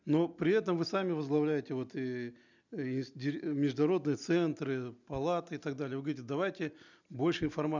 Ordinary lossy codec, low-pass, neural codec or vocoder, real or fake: none; 7.2 kHz; none; real